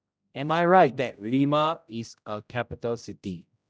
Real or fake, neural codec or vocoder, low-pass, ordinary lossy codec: fake; codec, 16 kHz, 0.5 kbps, X-Codec, HuBERT features, trained on general audio; none; none